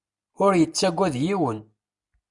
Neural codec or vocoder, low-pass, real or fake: none; 10.8 kHz; real